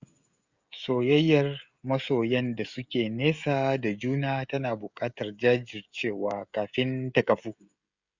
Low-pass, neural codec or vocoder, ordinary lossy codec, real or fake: 7.2 kHz; codec, 16 kHz, 16 kbps, FreqCodec, smaller model; Opus, 64 kbps; fake